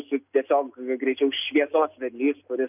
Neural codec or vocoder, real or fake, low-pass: none; real; 3.6 kHz